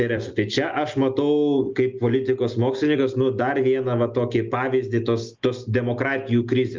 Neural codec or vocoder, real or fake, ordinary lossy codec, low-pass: none; real; Opus, 24 kbps; 7.2 kHz